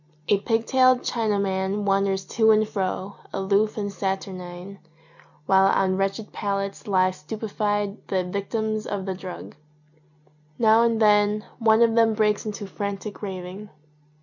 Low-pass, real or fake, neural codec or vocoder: 7.2 kHz; real; none